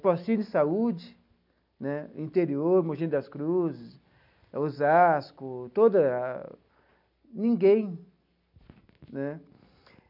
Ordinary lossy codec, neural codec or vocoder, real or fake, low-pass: none; none; real; 5.4 kHz